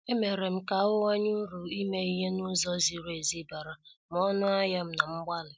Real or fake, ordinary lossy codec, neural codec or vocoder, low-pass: real; none; none; none